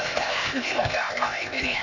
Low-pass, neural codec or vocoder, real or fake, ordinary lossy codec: 7.2 kHz; codec, 16 kHz, 0.8 kbps, ZipCodec; fake; none